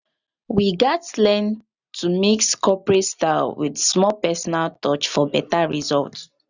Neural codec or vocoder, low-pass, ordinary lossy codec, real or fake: none; 7.2 kHz; none; real